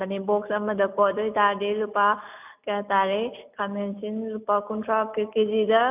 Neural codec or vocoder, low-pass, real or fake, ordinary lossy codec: none; 3.6 kHz; real; none